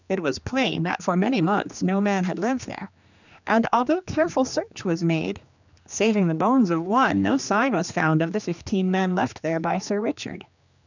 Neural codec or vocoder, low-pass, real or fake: codec, 16 kHz, 2 kbps, X-Codec, HuBERT features, trained on general audio; 7.2 kHz; fake